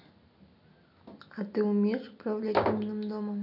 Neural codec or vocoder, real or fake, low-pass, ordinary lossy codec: none; real; 5.4 kHz; none